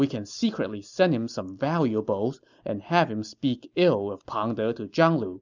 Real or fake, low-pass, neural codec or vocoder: real; 7.2 kHz; none